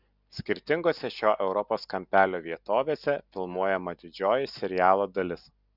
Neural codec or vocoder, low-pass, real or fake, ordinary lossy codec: none; 5.4 kHz; real; Opus, 64 kbps